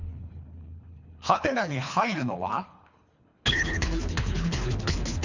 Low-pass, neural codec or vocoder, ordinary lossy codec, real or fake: 7.2 kHz; codec, 24 kHz, 3 kbps, HILCodec; Opus, 64 kbps; fake